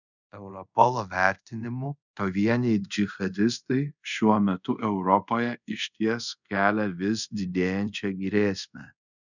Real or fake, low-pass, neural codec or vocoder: fake; 7.2 kHz; codec, 24 kHz, 0.5 kbps, DualCodec